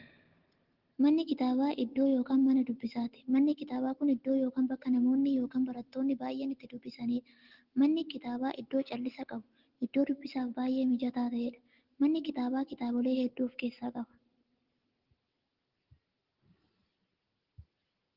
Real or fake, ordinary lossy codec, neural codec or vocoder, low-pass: real; Opus, 16 kbps; none; 5.4 kHz